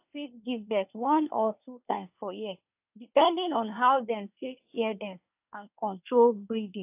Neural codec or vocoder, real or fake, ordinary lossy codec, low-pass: codec, 24 kHz, 1 kbps, SNAC; fake; AAC, 32 kbps; 3.6 kHz